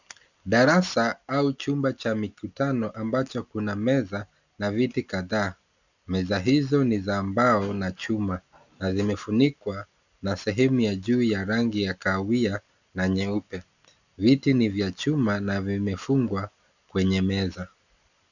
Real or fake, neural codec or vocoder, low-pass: real; none; 7.2 kHz